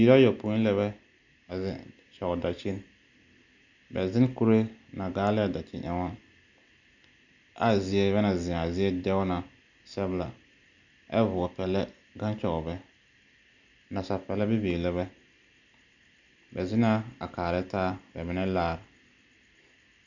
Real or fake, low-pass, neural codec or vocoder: real; 7.2 kHz; none